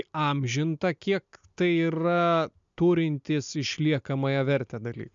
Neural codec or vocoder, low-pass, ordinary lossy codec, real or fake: none; 7.2 kHz; MP3, 64 kbps; real